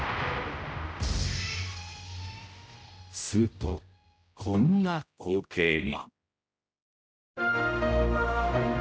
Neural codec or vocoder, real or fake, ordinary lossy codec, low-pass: codec, 16 kHz, 0.5 kbps, X-Codec, HuBERT features, trained on general audio; fake; none; none